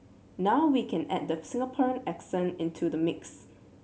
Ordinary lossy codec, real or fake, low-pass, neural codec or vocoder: none; real; none; none